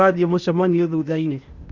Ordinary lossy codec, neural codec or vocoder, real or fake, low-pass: Opus, 64 kbps; codec, 16 kHz, 0.8 kbps, ZipCodec; fake; 7.2 kHz